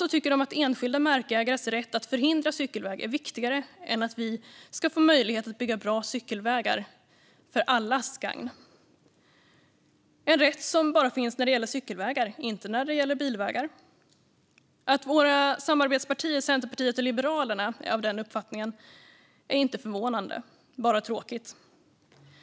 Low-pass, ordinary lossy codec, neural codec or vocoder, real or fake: none; none; none; real